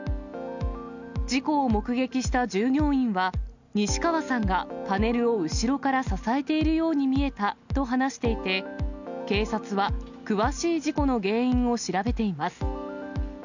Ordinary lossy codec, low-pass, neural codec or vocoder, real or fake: none; 7.2 kHz; none; real